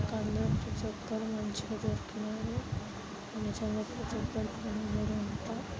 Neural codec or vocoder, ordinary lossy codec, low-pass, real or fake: none; none; none; real